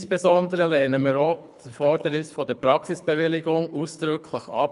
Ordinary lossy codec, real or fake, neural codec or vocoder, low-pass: none; fake; codec, 24 kHz, 3 kbps, HILCodec; 10.8 kHz